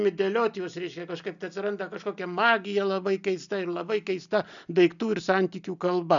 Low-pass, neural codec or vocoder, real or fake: 7.2 kHz; none; real